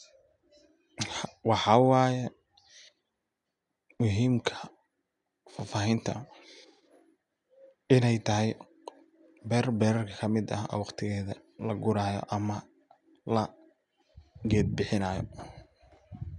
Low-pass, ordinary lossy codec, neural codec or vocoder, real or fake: 10.8 kHz; none; none; real